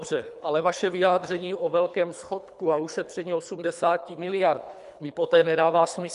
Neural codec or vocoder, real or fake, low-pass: codec, 24 kHz, 3 kbps, HILCodec; fake; 10.8 kHz